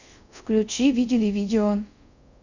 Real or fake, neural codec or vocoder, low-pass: fake; codec, 24 kHz, 0.5 kbps, DualCodec; 7.2 kHz